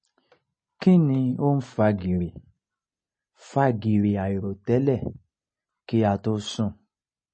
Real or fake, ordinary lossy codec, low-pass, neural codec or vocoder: real; MP3, 32 kbps; 9.9 kHz; none